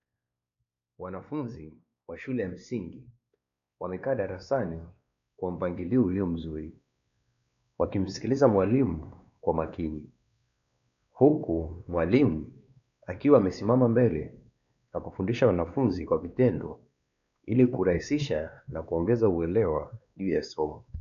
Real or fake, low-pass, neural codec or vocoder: fake; 7.2 kHz; codec, 16 kHz, 2 kbps, X-Codec, WavLM features, trained on Multilingual LibriSpeech